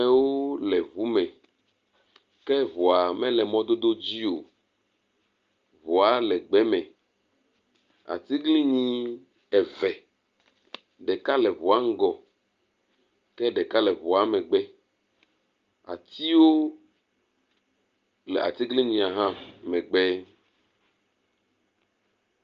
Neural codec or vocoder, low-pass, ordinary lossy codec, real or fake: none; 7.2 kHz; Opus, 32 kbps; real